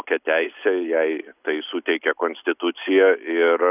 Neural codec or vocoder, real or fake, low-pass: none; real; 3.6 kHz